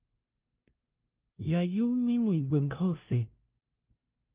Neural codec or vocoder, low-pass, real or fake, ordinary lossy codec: codec, 16 kHz, 0.5 kbps, FunCodec, trained on LibriTTS, 25 frames a second; 3.6 kHz; fake; Opus, 32 kbps